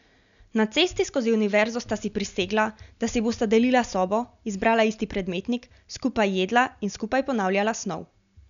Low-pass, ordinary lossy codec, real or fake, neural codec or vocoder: 7.2 kHz; none; real; none